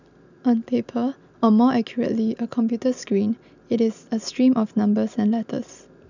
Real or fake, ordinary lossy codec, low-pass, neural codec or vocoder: real; none; 7.2 kHz; none